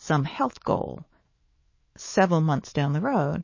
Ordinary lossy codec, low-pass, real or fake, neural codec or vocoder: MP3, 32 kbps; 7.2 kHz; fake; autoencoder, 48 kHz, 128 numbers a frame, DAC-VAE, trained on Japanese speech